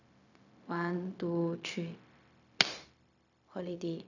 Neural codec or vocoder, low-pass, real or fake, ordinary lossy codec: codec, 16 kHz, 0.4 kbps, LongCat-Audio-Codec; 7.2 kHz; fake; none